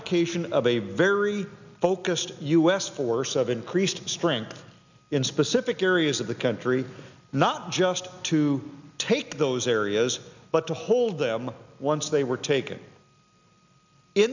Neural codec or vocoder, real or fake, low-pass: none; real; 7.2 kHz